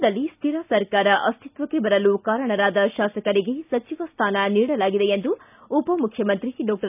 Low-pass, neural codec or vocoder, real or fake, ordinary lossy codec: 3.6 kHz; none; real; none